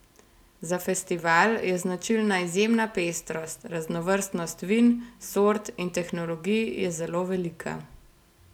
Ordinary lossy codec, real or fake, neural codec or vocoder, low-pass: none; real; none; 19.8 kHz